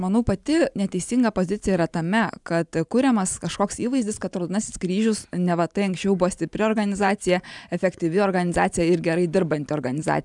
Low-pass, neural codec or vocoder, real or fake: 10.8 kHz; vocoder, 44.1 kHz, 128 mel bands every 512 samples, BigVGAN v2; fake